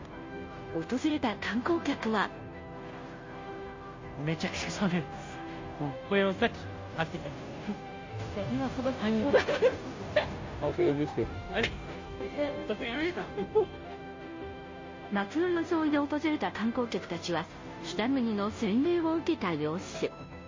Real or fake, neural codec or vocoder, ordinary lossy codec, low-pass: fake; codec, 16 kHz, 0.5 kbps, FunCodec, trained on Chinese and English, 25 frames a second; MP3, 32 kbps; 7.2 kHz